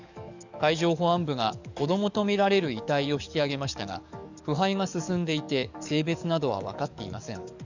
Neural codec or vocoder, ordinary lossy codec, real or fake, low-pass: codec, 44.1 kHz, 7.8 kbps, DAC; none; fake; 7.2 kHz